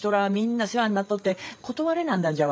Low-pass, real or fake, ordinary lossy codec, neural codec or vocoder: none; fake; none; codec, 16 kHz, 8 kbps, FreqCodec, larger model